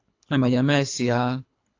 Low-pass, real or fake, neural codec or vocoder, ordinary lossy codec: 7.2 kHz; fake; codec, 24 kHz, 3 kbps, HILCodec; AAC, 48 kbps